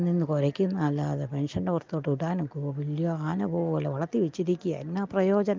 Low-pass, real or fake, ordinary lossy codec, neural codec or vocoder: 7.2 kHz; real; Opus, 24 kbps; none